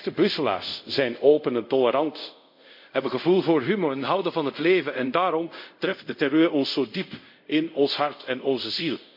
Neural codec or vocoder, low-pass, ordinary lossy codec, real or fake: codec, 24 kHz, 0.5 kbps, DualCodec; 5.4 kHz; MP3, 48 kbps; fake